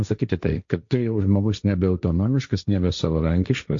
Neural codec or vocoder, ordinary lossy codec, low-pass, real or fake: codec, 16 kHz, 1.1 kbps, Voila-Tokenizer; MP3, 48 kbps; 7.2 kHz; fake